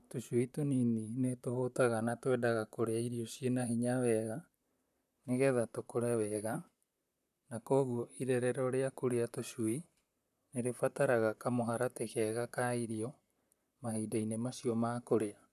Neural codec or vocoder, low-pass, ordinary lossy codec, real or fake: vocoder, 44.1 kHz, 128 mel bands, Pupu-Vocoder; 14.4 kHz; none; fake